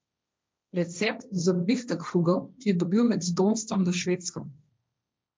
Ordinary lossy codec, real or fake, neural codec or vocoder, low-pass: none; fake; codec, 16 kHz, 1.1 kbps, Voila-Tokenizer; none